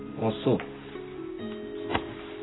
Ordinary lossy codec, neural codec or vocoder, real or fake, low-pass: AAC, 16 kbps; none; real; 7.2 kHz